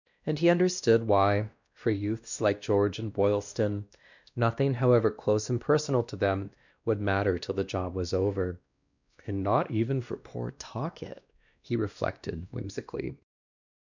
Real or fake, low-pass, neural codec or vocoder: fake; 7.2 kHz; codec, 16 kHz, 1 kbps, X-Codec, WavLM features, trained on Multilingual LibriSpeech